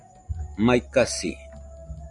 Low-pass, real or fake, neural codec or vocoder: 10.8 kHz; real; none